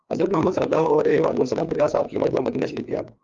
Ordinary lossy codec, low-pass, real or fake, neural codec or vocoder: Opus, 32 kbps; 7.2 kHz; fake; codec, 16 kHz, 2 kbps, FreqCodec, larger model